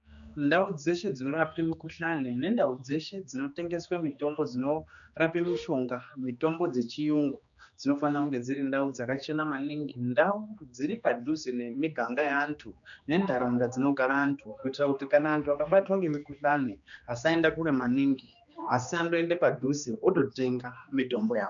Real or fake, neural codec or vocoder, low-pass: fake; codec, 16 kHz, 2 kbps, X-Codec, HuBERT features, trained on general audio; 7.2 kHz